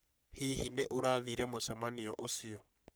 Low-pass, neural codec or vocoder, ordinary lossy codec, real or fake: none; codec, 44.1 kHz, 3.4 kbps, Pupu-Codec; none; fake